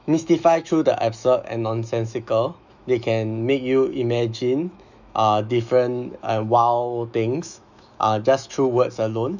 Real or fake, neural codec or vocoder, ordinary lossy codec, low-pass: fake; autoencoder, 48 kHz, 128 numbers a frame, DAC-VAE, trained on Japanese speech; none; 7.2 kHz